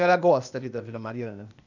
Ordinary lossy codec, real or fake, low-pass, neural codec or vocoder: none; fake; 7.2 kHz; codec, 16 kHz, 0.8 kbps, ZipCodec